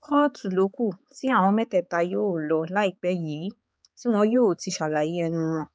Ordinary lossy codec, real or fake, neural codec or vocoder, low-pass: none; fake; codec, 16 kHz, 4 kbps, X-Codec, HuBERT features, trained on balanced general audio; none